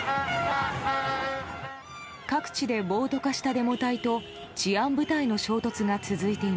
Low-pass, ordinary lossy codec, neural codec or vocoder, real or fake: none; none; none; real